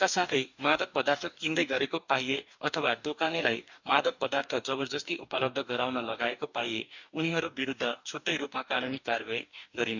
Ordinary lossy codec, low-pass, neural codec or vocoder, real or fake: none; 7.2 kHz; codec, 44.1 kHz, 2.6 kbps, DAC; fake